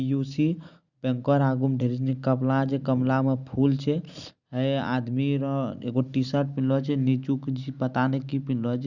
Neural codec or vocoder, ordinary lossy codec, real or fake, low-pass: none; Opus, 64 kbps; real; 7.2 kHz